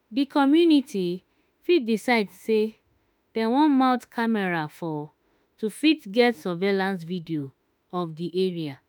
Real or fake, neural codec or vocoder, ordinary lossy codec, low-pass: fake; autoencoder, 48 kHz, 32 numbers a frame, DAC-VAE, trained on Japanese speech; none; none